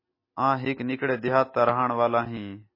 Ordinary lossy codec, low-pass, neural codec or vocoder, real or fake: MP3, 24 kbps; 5.4 kHz; none; real